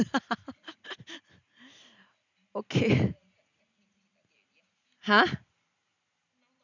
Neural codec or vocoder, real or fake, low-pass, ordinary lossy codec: none; real; 7.2 kHz; none